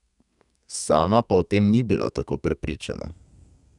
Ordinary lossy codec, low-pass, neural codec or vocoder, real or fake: none; 10.8 kHz; codec, 32 kHz, 1.9 kbps, SNAC; fake